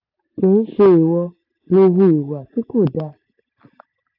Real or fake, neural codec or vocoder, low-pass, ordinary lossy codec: real; none; 5.4 kHz; AAC, 48 kbps